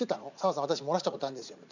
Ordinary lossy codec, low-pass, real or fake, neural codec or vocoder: none; 7.2 kHz; fake; vocoder, 44.1 kHz, 80 mel bands, Vocos